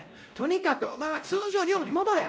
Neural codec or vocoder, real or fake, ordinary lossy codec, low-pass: codec, 16 kHz, 0.5 kbps, X-Codec, WavLM features, trained on Multilingual LibriSpeech; fake; none; none